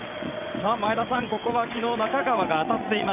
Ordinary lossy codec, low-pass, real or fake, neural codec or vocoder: none; 3.6 kHz; fake; vocoder, 22.05 kHz, 80 mel bands, WaveNeXt